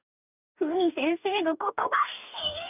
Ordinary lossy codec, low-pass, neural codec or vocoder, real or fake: none; 3.6 kHz; codec, 44.1 kHz, 2.6 kbps, DAC; fake